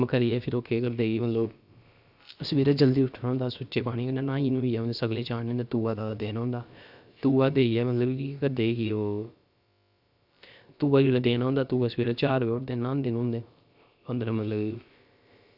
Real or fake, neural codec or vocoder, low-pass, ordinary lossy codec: fake; codec, 16 kHz, about 1 kbps, DyCAST, with the encoder's durations; 5.4 kHz; none